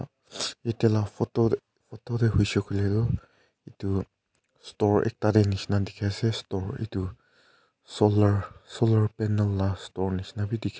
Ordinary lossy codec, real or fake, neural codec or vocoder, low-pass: none; real; none; none